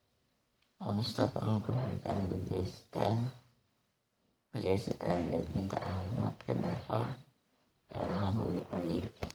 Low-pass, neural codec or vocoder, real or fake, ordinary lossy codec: none; codec, 44.1 kHz, 1.7 kbps, Pupu-Codec; fake; none